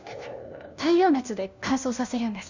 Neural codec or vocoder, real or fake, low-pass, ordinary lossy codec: codec, 16 kHz, 1 kbps, FunCodec, trained on LibriTTS, 50 frames a second; fake; 7.2 kHz; none